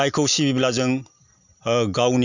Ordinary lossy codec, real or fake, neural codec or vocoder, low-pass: none; real; none; 7.2 kHz